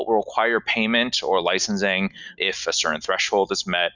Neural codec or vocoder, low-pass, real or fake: none; 7.2 kHz; real